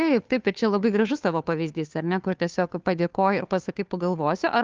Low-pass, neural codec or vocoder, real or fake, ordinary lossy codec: 7.2 kHz; codec, 16 kHz, 2 kbps, FunCodec, trained on Chinese and English, 25 frames a second; fake; Opus, 24 kbps